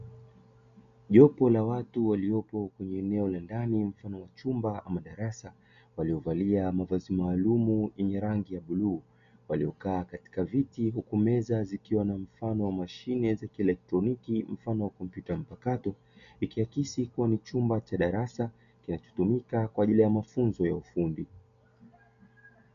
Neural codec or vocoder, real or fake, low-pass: none; real; 7.2 kHz